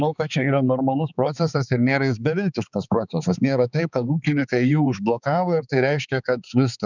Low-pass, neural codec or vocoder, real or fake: 7.2 kHz; codec, 16 kHz, 4 kbps, X-Codec, HuBERT features, trained on balanced general audio; fake